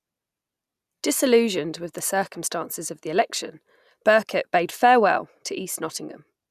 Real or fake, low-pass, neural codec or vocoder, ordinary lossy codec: real; 14.4 kHz; none; none